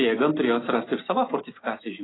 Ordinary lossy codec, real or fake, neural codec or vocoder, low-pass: AAC, 16 kbps; real; none; 7.2 kHz